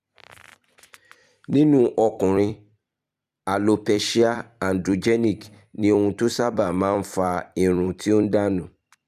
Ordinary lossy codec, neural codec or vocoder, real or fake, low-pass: none; vocoder, 44.1 kHz, 128 mel bands every 256 samples, BigVGAN v2; fake; 14.4 kHz